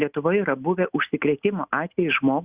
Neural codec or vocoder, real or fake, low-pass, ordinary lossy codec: none; real; 3.6 kHz; Opus, 64 kbps